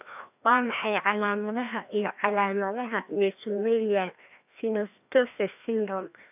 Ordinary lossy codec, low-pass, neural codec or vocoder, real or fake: AAC, 32 kbps; 3.6 kHz; codec, 16 kHz, 1 kbps, FreqCodec, larger model; fake